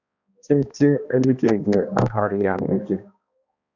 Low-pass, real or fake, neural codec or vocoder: 7.2 kHz; fake; codec, 16 kHz, 1 kbps, X-Codec, HuBERT features, trained on balanced general audio